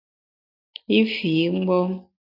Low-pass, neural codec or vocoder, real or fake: 5.4 kHz; none; real